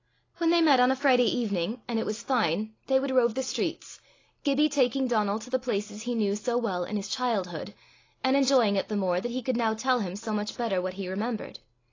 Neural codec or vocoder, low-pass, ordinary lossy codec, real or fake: none; 7.2 kHz; AAC, 32 kbps; real